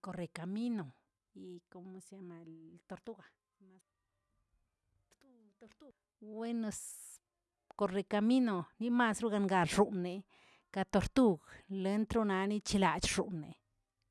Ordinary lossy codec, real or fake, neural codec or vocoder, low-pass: none; real; none; none